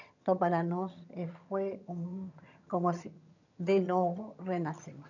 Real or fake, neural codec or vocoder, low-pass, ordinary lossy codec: fake; vocoder, 22.05 kHz, 80 mel bands, HiFi-GAN; 7.2 kHz; none